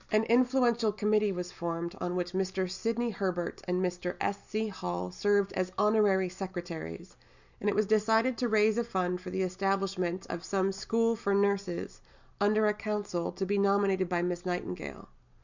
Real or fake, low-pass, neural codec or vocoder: real; 7.2 kHz; none